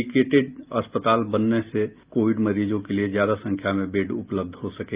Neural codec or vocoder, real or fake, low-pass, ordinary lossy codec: none; real; 3.6 kHz; Opus, 32 kbps